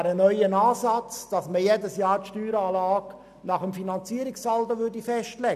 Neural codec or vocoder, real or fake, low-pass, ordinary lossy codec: none; real; 14.4 kHz; none